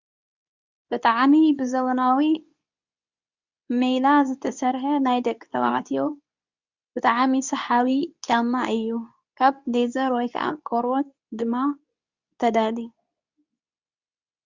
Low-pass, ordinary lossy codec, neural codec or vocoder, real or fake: 7.2 kHz; Opus, 64 kbps; codec, 24 kHz, 0.9 kbps, WavTokenizer, medium speech release version 2; fake